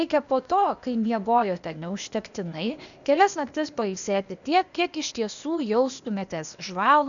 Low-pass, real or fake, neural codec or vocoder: 7.2 kHz; fake; codec, 16 kHz, 0.8 kbps, ZipCodec